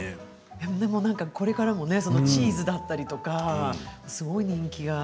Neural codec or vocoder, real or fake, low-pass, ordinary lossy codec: none; real; none; none